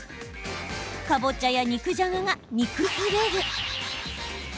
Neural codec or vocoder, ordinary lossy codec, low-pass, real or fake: none; none; none; real